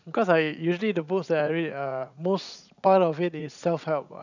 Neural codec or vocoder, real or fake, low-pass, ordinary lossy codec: vocoder, 44.1 kHz, 80 mel bands, Vocos; fake; 7.2 kHz; none